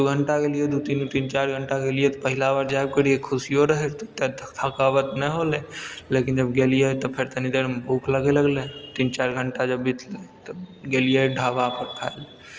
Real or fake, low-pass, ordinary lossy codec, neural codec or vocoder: real; 7.2 kHz; Opus, 24 kbps; none